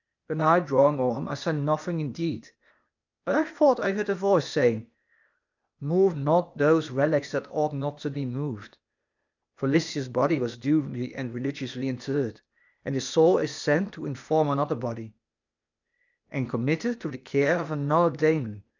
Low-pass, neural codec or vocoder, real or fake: 7.2 kHz; codec, 16 kHz, 0.8 kbps, ZipCodec; fake